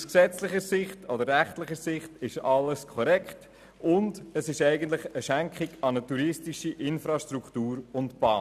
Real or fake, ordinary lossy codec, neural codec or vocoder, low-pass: real; none; none; 14.4 kHz